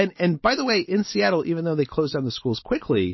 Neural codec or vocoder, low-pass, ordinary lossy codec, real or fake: none; 7.2 kHz; MP3, 24 kbps; real